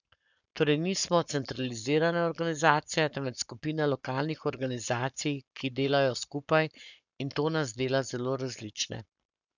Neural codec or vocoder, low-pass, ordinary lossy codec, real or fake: codec, 44.1 kHz, 7.8 kbps, Pupu-Codec; 7.2 kHz; none; fake